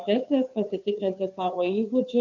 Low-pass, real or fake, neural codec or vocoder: 7.2 kHz; fake; codec, 16 kHz, 2 kbps, FunCodec, trained on Chinese and English, 25 frames a second